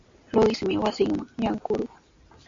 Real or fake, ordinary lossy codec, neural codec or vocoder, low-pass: real; MP3, 48 kbps; none; 7.2 kHz